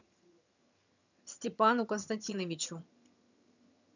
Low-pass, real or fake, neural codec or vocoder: 7.2 kHz; fake; vocoder, 22.05 kHz, 80 mel bands, HiFi-GAN